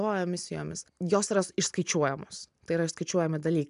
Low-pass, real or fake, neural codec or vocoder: 10.8 kHz; real; none